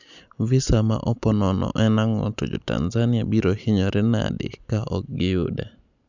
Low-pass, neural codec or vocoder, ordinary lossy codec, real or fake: 7.2 kHz; none; none; real